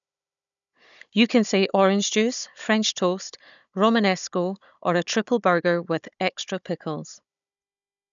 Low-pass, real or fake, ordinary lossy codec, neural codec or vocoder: 7.2 kHz; fake; none; codec, 16 kHz, 16 kbps, FunCodec, trained on Chinese and English, 50 frames a second